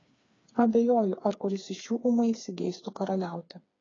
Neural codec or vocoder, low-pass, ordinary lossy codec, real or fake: codec, 16 kHz, 4 kbps, FreqCodec, smaller model; 7.2 kHz; AAC, 32 kbps; fake